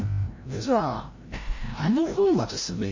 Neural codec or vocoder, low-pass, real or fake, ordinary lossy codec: codec, 16 kHz, 0.5 kbps, FreqCodec, larger model; 7.2 kHz; fake; MP3, 32 kbps